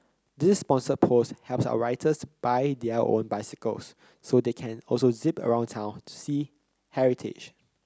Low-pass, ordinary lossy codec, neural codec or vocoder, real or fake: none; none; none; real